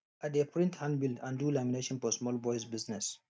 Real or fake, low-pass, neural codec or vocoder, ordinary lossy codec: real; none; none; none